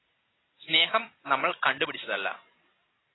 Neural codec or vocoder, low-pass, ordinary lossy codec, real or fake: none; 7.2 kHz; AAC, 16 kbps; real